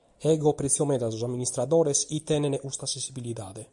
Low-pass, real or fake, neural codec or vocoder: 10.8 kHz; real; none